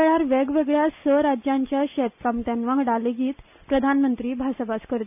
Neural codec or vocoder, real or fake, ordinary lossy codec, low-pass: none; real; none; 3.6 kHz